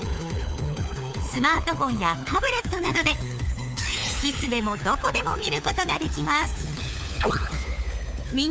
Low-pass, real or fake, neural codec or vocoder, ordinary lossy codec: none; fake; codec, 16 kHz, 4 kbps, FunCodec, trained on LibriTTS, 50 frames a second; none